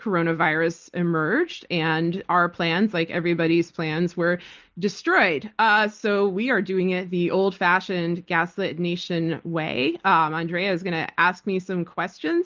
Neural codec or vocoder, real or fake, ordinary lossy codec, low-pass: none; real; Opus, 32 kbps; 7.2 kHz